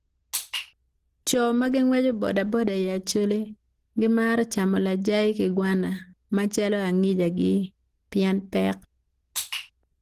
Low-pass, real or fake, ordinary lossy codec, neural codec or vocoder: 14.4 kHz; real; Opus, 16 kbps; none